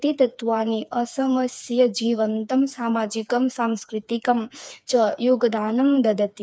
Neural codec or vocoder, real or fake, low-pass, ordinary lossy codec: codec, 16 kHz, 4 kbps, FreqCodec, smaller model; fake; none; none